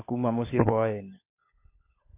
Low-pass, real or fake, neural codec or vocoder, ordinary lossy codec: 3.6 kHz; fake; codec, 16 kHz, 16 kbps, FunCodec, trained on LibriTTS, 50 frames a second; MP3, 24 kbps